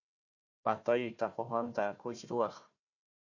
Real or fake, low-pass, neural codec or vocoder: fake; 7.2 kHz; codec, 16 kHz, 1 kbps, FunCodec, trained on Chinese and English, 50 frames a second